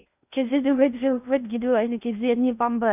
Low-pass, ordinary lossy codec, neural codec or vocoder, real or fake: 3.6 kHz; none; codec, 16 kHz in and 24 kHz out, 0.8 kbps, FocalCodec, streaming, 65536 codes; fake